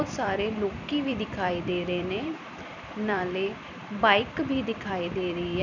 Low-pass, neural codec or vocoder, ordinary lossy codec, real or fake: 7.2 kHz; vocoder, 44.1 kHz, 128 mel bands every 256 samples, BigVGAN v2; none; fake